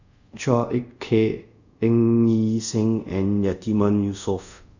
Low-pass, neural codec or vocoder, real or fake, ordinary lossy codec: 7.2 kHz; codec, 24 kHz, 0.5 kbps, DualCodec; fake; none